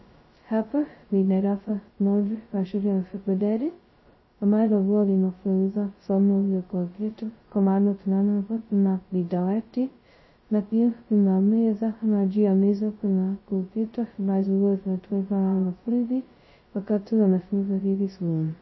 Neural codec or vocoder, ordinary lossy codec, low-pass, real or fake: codec, 16 kHz, 0.2 kbps, FocalCodec; MP3, 24 kbps; 7.2 kHz; fake